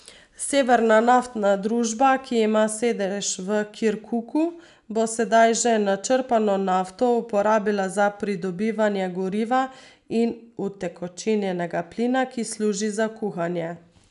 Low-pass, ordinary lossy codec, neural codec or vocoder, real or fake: 10.8 kHz; none; none; real